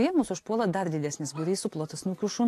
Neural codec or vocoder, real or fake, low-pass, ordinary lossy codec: none; real; 14.4 kHz; AAC, 64 kbps